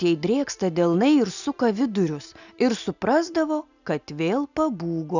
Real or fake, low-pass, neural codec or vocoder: real; 7.2 kHz; none